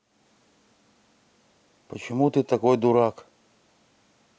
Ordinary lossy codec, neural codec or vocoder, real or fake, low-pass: none; none; real; none